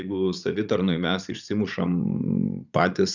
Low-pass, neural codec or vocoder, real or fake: 7.2 kHz; vocoder, 44.1 kHz, 80 mel bands, Vocos; fake